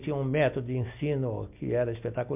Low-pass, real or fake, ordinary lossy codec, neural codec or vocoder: 3.6 kHz; real; MP3, 32 kbps; none